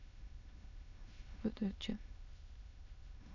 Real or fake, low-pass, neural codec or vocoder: fake; 7.2 kHz; autoencoder, 22.05 kHz, a latent of 192 numbers a frame, VITS, trained on many speakers